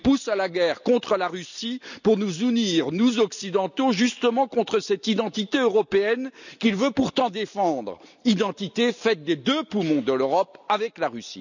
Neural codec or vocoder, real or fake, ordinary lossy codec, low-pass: none; real; none; 7.2 kHz